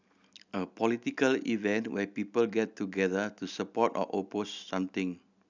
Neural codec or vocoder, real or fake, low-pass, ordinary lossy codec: none; real; 7.2 kHz; none